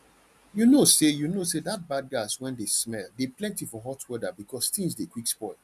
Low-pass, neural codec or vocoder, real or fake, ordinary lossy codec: 14.4 kHz; none; real; none